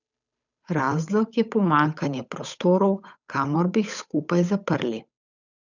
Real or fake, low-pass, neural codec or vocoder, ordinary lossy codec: fake; 7.2 kHz; codec, 16 kHz, 8 kbps, FunCodec, trained on Chinese and English, 25 frames a second; none